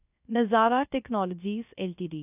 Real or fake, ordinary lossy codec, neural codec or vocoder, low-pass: fake; none; codec, 16 kHz, 0.3 kbps, FocalCodec; 3.6 kHz